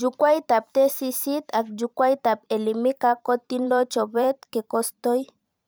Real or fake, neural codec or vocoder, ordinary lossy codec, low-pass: fake; vocoder, 44.1 kHz, 128 mel bands every 512 samples, BigVGAN v2; none; none